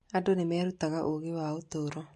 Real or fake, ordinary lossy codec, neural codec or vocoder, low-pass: real; MP3, 48 kbps; none; 14.4 kHz